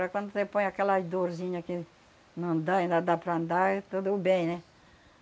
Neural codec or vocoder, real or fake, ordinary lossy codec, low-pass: none; real; none; none